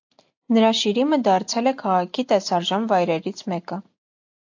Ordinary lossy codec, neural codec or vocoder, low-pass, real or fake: AAC, 48 kbps; none; 7.2 kHz; real